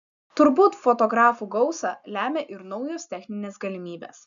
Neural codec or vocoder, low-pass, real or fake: none; 7.2 kHz; real